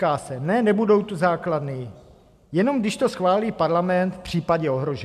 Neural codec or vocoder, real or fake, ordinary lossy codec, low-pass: none; real; MP3, 96 kbps; 14.4 kHz